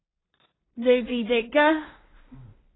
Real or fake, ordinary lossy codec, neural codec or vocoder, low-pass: fake; AAC, 16 kbps; codec, 16 kHz in and 24 kHz out, 0.4 kbps, LongCat-Audio-Codec, two codebook decoder; 7.2 kHz